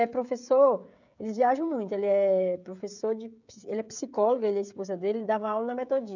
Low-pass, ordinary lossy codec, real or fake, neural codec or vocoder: 7.2 kHz; none; fake; codec, 16 kHz, 16 kbps, FreqCodec, smaller model